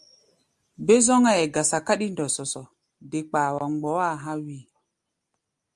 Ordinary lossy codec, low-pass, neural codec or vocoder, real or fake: Opus, 32 kbps; 10.8 kHz; none; real